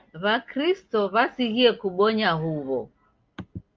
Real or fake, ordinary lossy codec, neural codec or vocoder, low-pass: real; Opus, 32 kbps; none; 7.2 kHz